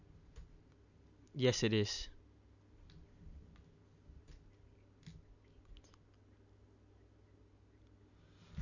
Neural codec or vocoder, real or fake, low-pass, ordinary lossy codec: none; real; 7.2 kHz; none